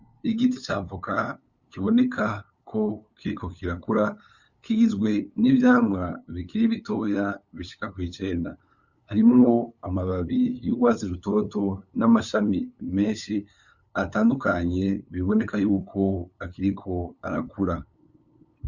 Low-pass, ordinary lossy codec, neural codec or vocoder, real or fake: 7.2 kHz; Opus, 64 kbps; codec, 16 kHz, 8 kbps, FunCodec, trained on LibriTTS, 25 frames a second; fake